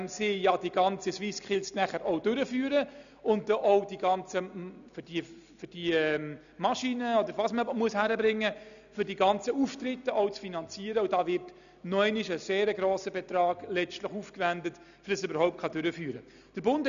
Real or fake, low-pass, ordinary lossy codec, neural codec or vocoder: real; 7.2 kHz; none; none